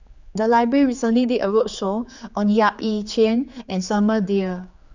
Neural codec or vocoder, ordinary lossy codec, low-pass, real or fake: codec, 16 kHz, 4 kbps, X-Codec, HuBERT features, trained on general audio; Opus, 64 kbps; 7.2 kHz; fake